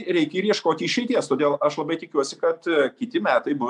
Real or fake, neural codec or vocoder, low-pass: real; none; 10.8 kHz